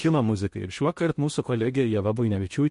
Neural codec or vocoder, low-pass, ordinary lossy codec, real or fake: codec, 16 kHz in and 24 kHz out, 0.8 kbps, FocalCodec, streaming, 65536 codes; 10.8 kHz; MP3, 48 kbps; fake